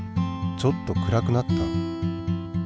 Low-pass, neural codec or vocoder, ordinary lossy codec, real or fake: none; none; none; real